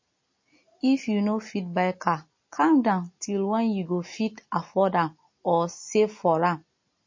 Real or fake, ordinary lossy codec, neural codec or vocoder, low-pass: real; MP3, 32 kbps; none; 7.2 kHz